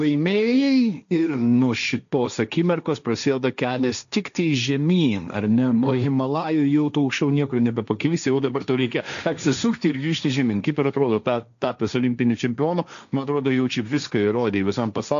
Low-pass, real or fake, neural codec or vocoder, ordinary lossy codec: 7.2 kHz; fake; codec, 16 kHz, 1.1 kbps, Voila-Tokenizer; AAC, 96 kbps